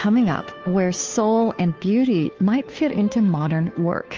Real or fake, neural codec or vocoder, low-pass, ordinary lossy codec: fake; codec, 16 kHz, 2 kbps, FunCodec, trained on Chinese and English, 25 frames a second; 7.2 kHz; Opus, 24 kbps